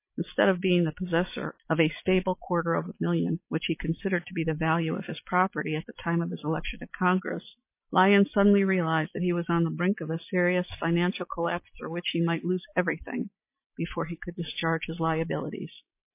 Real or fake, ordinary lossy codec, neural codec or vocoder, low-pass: real; MP3, 24 kbps; none; 3.6 kHz